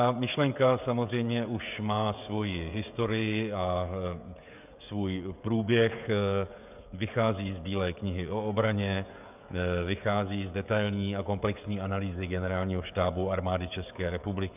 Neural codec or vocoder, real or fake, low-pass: codec, 16 kHz, 16 kbps, FreqCodec, smaller model; fake; 3.6 kHz